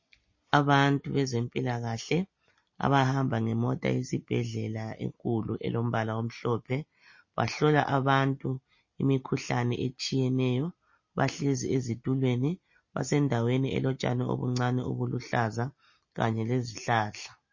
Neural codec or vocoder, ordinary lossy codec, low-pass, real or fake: none; MP3, 32 kbps; 7.2 kHz; real